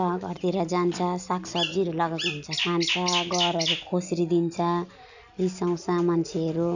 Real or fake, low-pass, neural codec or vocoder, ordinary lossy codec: real; 7.2 kHz; none; none